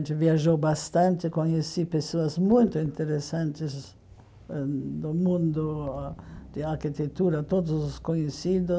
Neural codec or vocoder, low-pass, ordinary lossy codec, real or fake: none; none; none; real